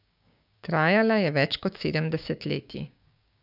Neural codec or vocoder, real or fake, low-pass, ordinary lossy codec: codec, 16 kHz, 6 kbps, DAC; fake; 5.4 kHz; none